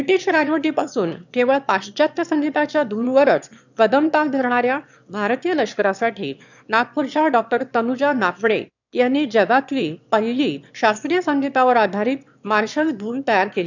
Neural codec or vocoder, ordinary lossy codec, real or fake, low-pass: autoencoder, 22.05 kHz, a latent of 192 numbers a frame, VITS, trained on one speaker; none; fake; 7.2 kHz